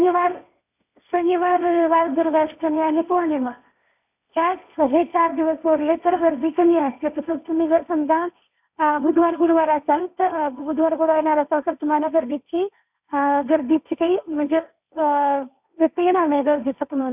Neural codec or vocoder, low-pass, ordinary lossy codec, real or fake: codec, 16 kHz, 1.1 kbps, Voila-Tokenizer; 3.6 kHz; none; fake